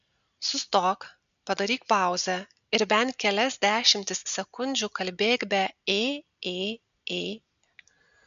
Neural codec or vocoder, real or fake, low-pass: none; real; 7.2 kHz